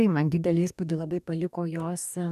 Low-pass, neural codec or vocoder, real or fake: 14.4 kHz; codec, 44.1 kHz, 2.6 kbps, DAC; fake